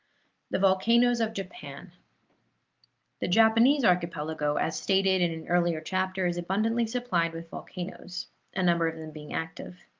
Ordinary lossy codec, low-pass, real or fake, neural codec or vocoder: Opus, 32 kbps; 7.2 kHz; real; none